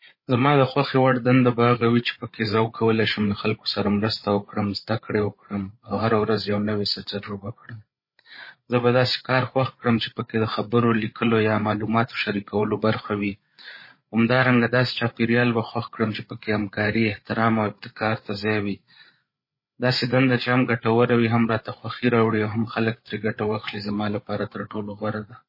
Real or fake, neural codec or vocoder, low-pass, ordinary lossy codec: fake; codec, 16 kHz, 8 kbps, FreqCodec, larger model; 5.4 kHz; MP3, 24 kbps